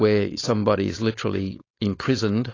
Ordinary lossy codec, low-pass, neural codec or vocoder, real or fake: AAC, 32 kbps; 7.2 kHz; codec, 16 kHz, 4.8 kbps, FACodec; fake